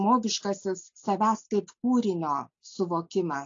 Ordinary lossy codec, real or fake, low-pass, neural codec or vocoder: AAC, 48 kbps; real; 7.2 kHz; none